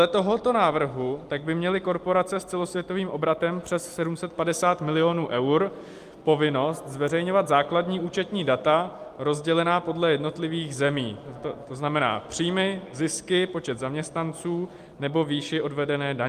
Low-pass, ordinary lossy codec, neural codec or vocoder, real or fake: 14.4 kHz; Opus, 32 kbps; none; real